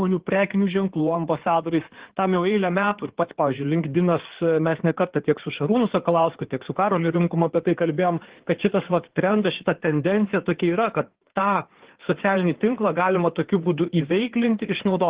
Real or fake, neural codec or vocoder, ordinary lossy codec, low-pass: fake; codec, 16 kHz in and 24 kHz out, 2.2 kbps, FireRedTTS-2 codec; Opus, 16 kbps; 3.6 kHz